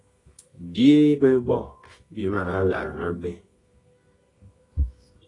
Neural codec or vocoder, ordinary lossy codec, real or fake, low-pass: codec, 24 kHz, 0.9 kbps, WavTokenizer, medium music audio release; AAC, 32 kbps; fake; 10.8 kHz